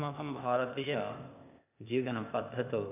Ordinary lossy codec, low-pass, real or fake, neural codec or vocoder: none; 3.6 kHz; fake; codec, 16 kHz, 0.8 kbps, ZipCodec